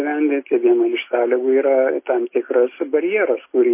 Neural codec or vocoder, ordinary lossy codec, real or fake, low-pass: none; MP3, 24 kbps; real; 3.6 kHz